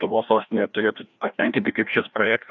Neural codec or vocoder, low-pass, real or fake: codec, 16 kHz, 1 kbps, FreqCodec, larger model; 7.2 kHz; fake